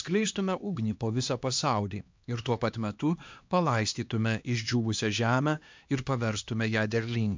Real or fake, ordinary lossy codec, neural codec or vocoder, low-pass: fake; MP3, 64 kbps; codec, 16 kHz, 2 kbps, X-Codec, HuBERT features, trained on LibriSpeech; 7.2 kHz